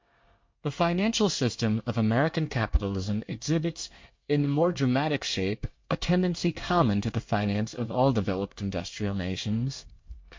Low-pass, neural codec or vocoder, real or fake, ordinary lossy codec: 7.2 kHz; codec, 24 kHz, 1 kbps, SNAC; fake; MP3, 48 kbps